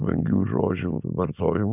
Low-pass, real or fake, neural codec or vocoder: 3.6 kHz; real; none